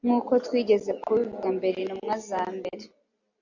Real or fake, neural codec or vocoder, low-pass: real; none; 7.2 kHz